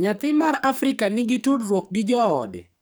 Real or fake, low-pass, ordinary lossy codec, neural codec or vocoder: fake; none; none; codec, 44.1 kHz, 2.6 kbps, SNAC